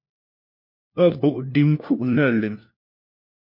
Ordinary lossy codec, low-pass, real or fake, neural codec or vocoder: MP3, 32 kbps; 5.4 kHz; fake; codec, 16 kHz, 1 kbps, FunCodec, trained on LibriTTS, 50 frames a second